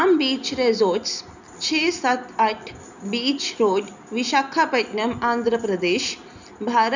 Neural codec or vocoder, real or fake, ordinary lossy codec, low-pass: none; real; none; 7.2 kHz